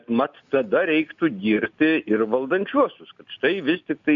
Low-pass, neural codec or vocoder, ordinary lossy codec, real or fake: 7.2 kHz; none; AAC, 64 kbps; real